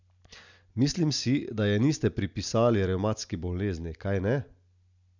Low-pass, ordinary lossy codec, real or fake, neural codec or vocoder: 7.2 kHz; none; real; none